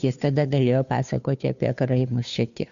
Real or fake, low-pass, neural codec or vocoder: fake; 7.2 kHz; codec, 16 kHz, 2 kbps, FunCodec, trained on Chinese and English, 25 frames a second